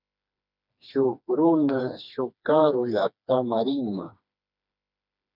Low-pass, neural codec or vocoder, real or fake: 5.4 kHz; codec, 16 kHz, 2 kbps, FreqCodec, smaller model; fake